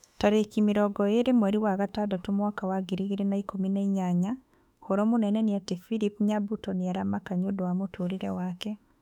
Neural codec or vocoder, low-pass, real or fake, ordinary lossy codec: autoencoder, 48 kHz, 32 numbers a frame, DAC-VAE, trained on Japanese speech; 19.8 kHz; fake; none